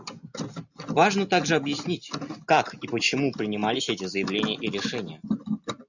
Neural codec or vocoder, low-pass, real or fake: none; 7.2 kHz; real